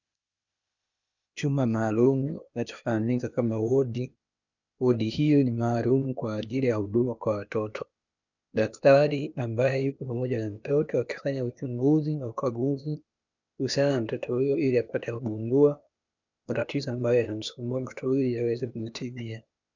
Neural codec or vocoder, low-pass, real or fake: codec, 16 kHz, 0.8 kbps, ZipCodec; 7.2 kHz; fake